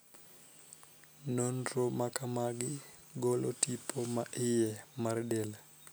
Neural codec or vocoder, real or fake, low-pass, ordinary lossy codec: none; real; none; none